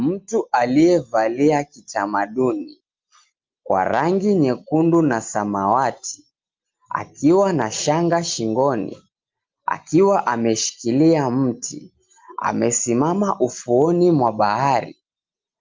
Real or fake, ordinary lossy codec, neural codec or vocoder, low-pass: real; Opus, 32 kbps; none; 7.2 kHz